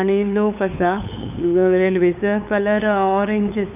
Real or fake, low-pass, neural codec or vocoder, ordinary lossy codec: fake; 3.6 kHz; codec, 16 kHz, 8 kbps, FunCodec, trained on LibriTTS, 25 frames a second; none